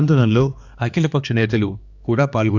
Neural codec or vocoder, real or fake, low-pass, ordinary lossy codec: codec, 16 kHz, 2 kbps, X-Codec, HuBERT features, trained on balanced general audio; fake; 7.2 kHz; Opus, 64 kbps